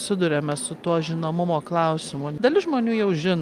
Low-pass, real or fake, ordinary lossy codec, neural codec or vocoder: 14.4 kHz; real; Opus, 32 kbps; none